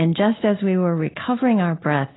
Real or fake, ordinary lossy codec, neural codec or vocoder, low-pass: real; AAC, 16 kbps; none; 7.2 kHz